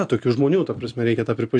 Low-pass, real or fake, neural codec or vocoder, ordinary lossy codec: 9.9 kHz; real; none; AAC, 64 kbps